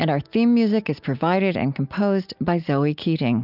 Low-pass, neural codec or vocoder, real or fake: 5.4 kHz; none; real